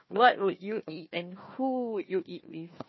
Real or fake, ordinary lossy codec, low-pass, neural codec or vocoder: fake; MP3, 24 kbps; 7.2 kHz; codec, 16 kHz, 1 kbps, FunCodec, trained on Chinese and English, 50 frames a second